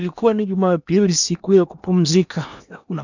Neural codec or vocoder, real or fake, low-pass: codec, 16 kHz in and 24 kHz out, 0.8 kbps, FocalCodec, streaming, 65536 codes; fake; 7.2 kHz